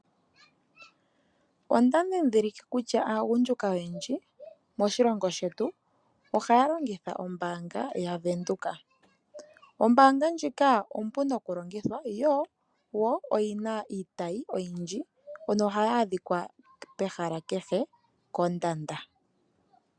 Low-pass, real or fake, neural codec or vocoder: 9.9 kHz; real; none